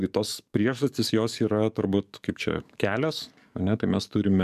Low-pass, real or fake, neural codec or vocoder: 14.4 kHz; fake; codec, 44.1 kHz, 7.8 kbps, DAC